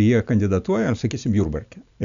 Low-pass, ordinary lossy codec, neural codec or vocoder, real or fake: 7.2 kHz; MP3, 96 kbps; none; real